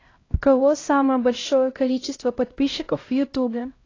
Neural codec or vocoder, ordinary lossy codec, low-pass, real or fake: codec, 16 kHz, 0.5 kbps, X-Codec, HuBERT features, trained on LibriSpeech; AAC, 32 kbps; 7.2 kHz; fake